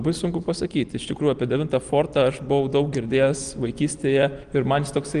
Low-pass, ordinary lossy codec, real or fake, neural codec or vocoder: 9.9 kHz; Opus, 24 kbps; real; none